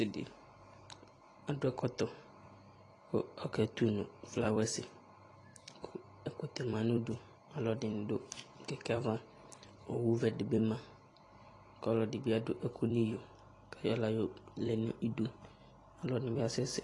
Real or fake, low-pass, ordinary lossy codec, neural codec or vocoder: fake; 10.8 kHz; AAC, 32 kbps; vocoder, 44.1 kHz, 128 mel bands every 256 samples, BigVGAN v2